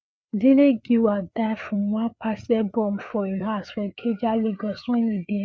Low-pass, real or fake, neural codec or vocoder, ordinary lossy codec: none; fake; codec, 16 kHz, 4 kbps, FreqCodec, larger model; none